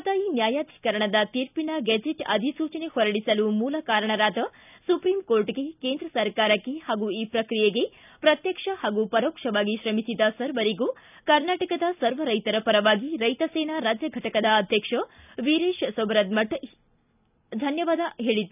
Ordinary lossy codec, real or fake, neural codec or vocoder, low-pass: none; real; none; 3.6 kHz